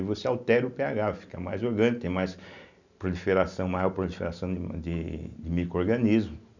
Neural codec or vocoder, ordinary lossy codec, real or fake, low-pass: none; none; real; 7.2 kHz